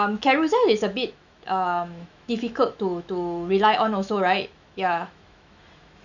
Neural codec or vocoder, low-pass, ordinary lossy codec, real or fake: autoencoder, 48 kHz, 128 numbers a frame, DAC-VAE, trained on Japanese speech; 7.2 kHz; none; fake